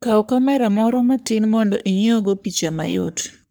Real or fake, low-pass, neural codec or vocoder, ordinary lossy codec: fake; none; codec, 44.1 kHz, 3.4 kbps, Pupu-Codec; none